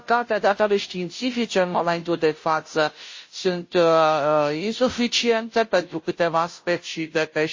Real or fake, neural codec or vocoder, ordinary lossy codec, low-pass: fake; codec, 16 kHz, 0.5 kbps, FunCodec, trained on Chinese and English, 25 frames a second; MP3, 32 kbps; 7.2 kHz